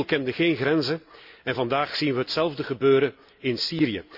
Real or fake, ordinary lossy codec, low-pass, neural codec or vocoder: real; AAC, 48 kbps; 5.4 kHz; none